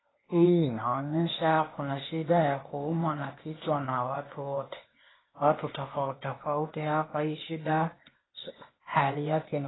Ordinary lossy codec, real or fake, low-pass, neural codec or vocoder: AAC, 16 kbps; fake; 7.2 kHz; codec, 16 kHz, 0.8 kbps, ZipCodec